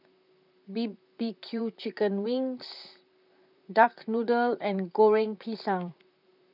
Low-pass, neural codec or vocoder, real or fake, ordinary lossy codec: 5.4 kHz; vocoder, 44.1 kHz, 128 mel bands, Pupu-Vocoder; fake; none